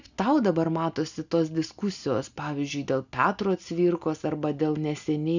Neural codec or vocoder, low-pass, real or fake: none; 7.2 kHz; real